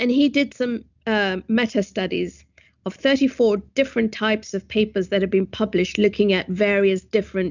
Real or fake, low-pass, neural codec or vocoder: real; 7.2 kHz; none